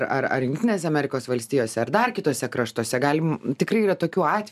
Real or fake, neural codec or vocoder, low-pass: real; none; 14.4 kHz